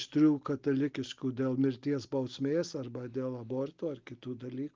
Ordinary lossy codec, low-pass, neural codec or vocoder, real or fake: Opus, 32 kbps; 7.2 kHz; none; real